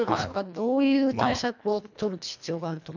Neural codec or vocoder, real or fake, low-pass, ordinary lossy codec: codec, 24 kHz, 1.5 kbps, HILCodec; fake; 7.2 kHz; none